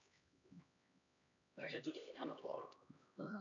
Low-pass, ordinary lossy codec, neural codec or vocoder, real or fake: 7.2 kHz; MP3, 96 kbps; codec, 16 kHz, 1 kbps, X-Codec, HuBERT features, trained on LibriSpeech; fake